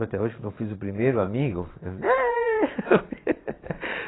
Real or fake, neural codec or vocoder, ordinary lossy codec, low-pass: fake; vocoder, 22.05 kHz, 80 mel bands, Vocos; AAC, 16 kbps; 7.2 kHz